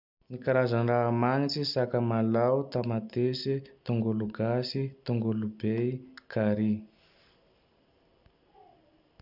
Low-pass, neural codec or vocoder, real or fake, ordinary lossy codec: 5.4 kHz; none; real; none